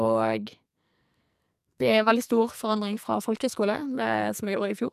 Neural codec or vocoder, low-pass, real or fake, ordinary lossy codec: codec, 44.1 kHz, 2.6 kbps, SNAC; 14.4 kHz; fake; none